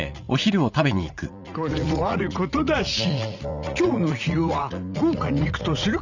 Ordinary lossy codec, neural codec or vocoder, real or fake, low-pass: none; vocoder, 44.1 kHz, 80 mel bands, Vocos; fake; 7.2 kHz